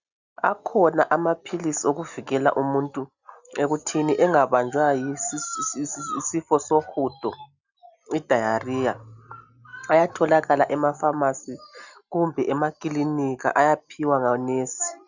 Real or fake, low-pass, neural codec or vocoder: real; 7.2 kHz; none